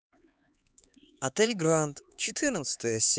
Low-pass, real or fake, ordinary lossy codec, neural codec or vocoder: none; fake; none; codec, 16 kHz, 4 kbps, X-Codec, HuBERT features, trained on LibriSpeech